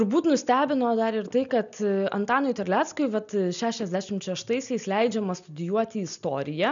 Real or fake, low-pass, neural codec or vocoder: real; 7.2 kHz; none